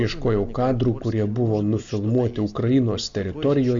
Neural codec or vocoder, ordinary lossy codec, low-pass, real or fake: none; MP3, 64 kbps; 7.2 kHz; real